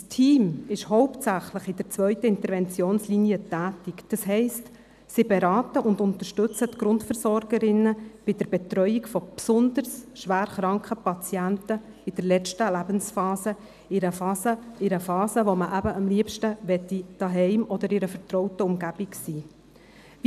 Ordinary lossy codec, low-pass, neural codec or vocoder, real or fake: none; 14.4 kHz; none; real